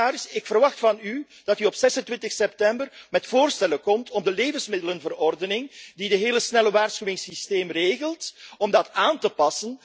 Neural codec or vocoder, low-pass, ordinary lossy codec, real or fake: none; none; none; real